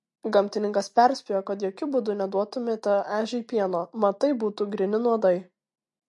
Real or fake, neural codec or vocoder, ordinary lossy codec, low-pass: real; none; MP3, 48 kbps; 10.8 kHz